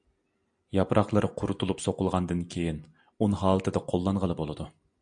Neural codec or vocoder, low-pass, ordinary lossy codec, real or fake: none; 9.9 kHz; AAC, 64 kbps; real